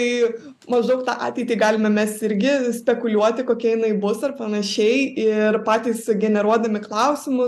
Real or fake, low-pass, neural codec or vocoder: real; 14.4 kHz; none